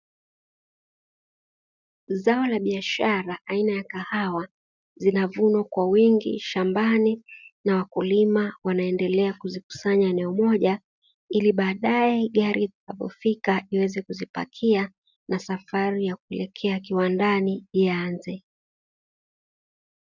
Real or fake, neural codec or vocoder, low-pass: real; none; 7.2 kHz